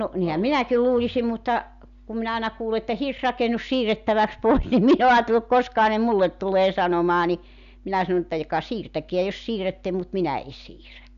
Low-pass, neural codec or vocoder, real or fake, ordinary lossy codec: 7.2 kHz; none; real; none